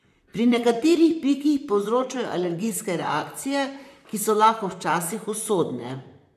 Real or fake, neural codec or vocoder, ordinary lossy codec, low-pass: fake; vocoder, 44.1 kHz, 128 mel bands, Pupu-Vocoder; none; 14.4 kHz